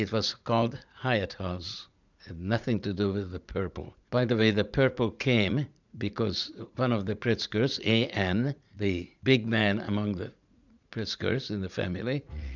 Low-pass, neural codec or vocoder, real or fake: 7.2 kHz; vocoder, 44.1 kHz, 80 mel bands, Vocos; fake